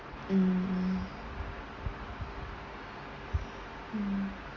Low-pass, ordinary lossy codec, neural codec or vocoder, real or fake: 7.2 kHz; Opus, 32 kbps; none; real